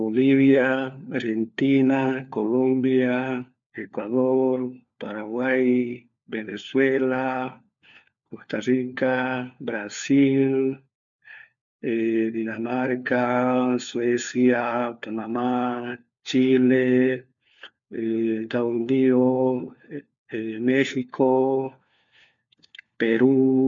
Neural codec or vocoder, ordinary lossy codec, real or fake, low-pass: codec, 16 kHz, 4 kbps, FunCodec, trained on LibriTTS, 50 frames a second; MP3, 64 kbps; fake; 7.2 kHz